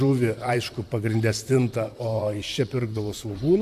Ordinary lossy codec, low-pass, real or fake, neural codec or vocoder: AAC, 96 kbps; 14.4 kHz; fake; vocoder, 44.1 kHz, 128 mel bands, Pupu-Vocoder